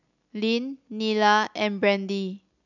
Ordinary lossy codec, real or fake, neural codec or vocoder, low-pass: none; real; none; 7.2 kHz